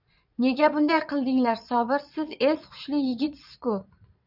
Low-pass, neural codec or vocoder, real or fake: 5.4 kHz; vocoder, 22.05 kHz, 80 mel bands, Vocos; fake